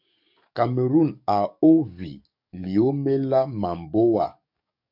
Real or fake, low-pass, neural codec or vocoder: fake; 5.4 kHz; codec, 44.1 kHz, 7.8 kbps, DAC